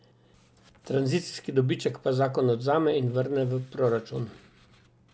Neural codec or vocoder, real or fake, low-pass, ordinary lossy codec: none; real; none; none